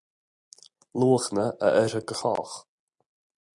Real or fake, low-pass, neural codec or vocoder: real; 10.8 kHz; none